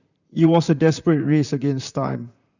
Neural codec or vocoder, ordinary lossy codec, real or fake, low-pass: vocoder, 44.1 kHz, 128 mel bands, Pupu-Vocoder; none; fake; 7.2 kHz